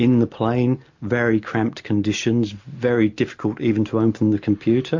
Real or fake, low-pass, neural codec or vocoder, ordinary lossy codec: real; 7.2 kHz; none; MP3, 48 kbps